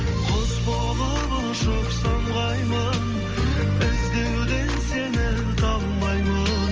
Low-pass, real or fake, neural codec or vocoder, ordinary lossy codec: 7.2 kHz; real; none; Opus, 24 kbps